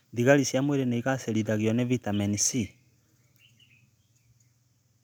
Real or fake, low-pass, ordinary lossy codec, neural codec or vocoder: real; none; none; none